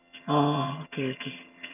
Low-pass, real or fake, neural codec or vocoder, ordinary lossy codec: 3.6 kHz; fake; vocoder, 22.05 kHz, 80 mel bands, HiFi-GAN; none